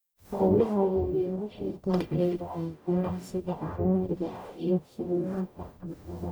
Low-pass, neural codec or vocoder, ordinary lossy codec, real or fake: none; codec, 44.1 kHz, 0.9 kbps, DAC; none; fake